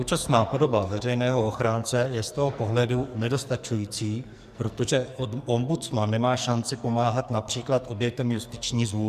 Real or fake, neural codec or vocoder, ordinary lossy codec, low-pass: fake; codec, 44.1 kHz, 2.6 kbps, SNAC; Opus, 64 kbps; 14.4 kHz